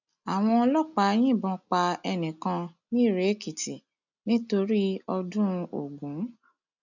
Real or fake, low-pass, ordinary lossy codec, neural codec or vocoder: real; 7.2 kHz; none; none